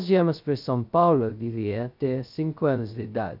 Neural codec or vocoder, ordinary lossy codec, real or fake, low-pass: codec, 16 kHz, 0.2 kbps, FocalCodec; none; fake; 5.4 kHz